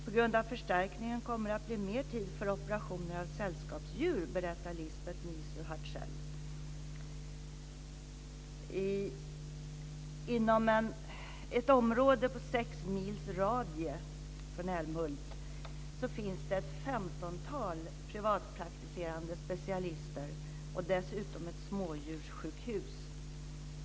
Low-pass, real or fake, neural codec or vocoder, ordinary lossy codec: none; real; none; none